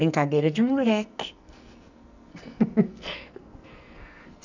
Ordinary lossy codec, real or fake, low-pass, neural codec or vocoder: none; fake; 7.2 kHz; codec, 44.1 kHz, 2.6 kbps, SNAC